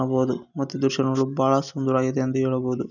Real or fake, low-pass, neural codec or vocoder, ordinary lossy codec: real; 7.2 kHz; none; none